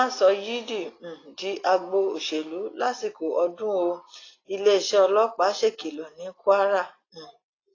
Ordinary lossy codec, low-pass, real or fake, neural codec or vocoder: AAC, 32 kbps; 7.2 kHz; real; none